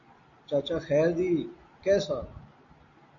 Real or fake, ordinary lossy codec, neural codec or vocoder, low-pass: real; AAC, 48 kbps; none; 7.2 kHz